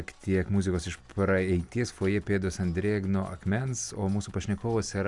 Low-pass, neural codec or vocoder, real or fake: 10.8 kHz; none; real